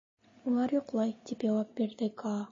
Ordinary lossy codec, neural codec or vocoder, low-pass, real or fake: MP3, 32 kbps; none; 7.2 kHz; real